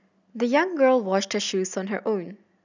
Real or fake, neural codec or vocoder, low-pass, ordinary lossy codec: real; none; 7.2 kHz; none